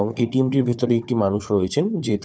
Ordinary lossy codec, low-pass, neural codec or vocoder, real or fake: none; none; codec, 16 kHz, 4 kbps, FunCodec, trained on Chinese and English, 50 frames a second; fake